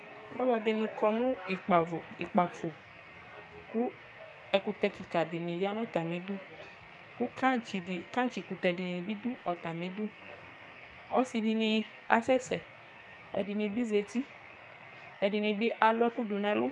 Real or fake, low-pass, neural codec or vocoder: fake; 10.8 kHz; codec, 44.1 kHz, 2.6 kbps, SNAC